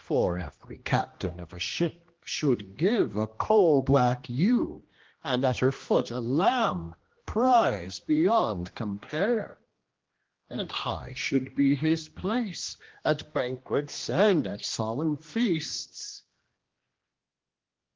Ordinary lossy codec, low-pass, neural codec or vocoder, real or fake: Opus, 16 kbps; 7.2 kHz; codec, 16 kHz, 1 kbps, X-Codec, HuBERT features, trained on general audio; fake